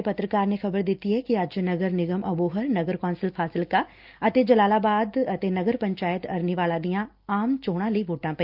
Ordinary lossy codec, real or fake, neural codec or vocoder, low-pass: Opus, 24 kbps; real; none; 5.4 kHz